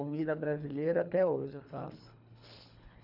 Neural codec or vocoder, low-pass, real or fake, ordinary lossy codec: codec, 24 kHz, 3 kbps, HILCodec; 5.4 kHz; fake; none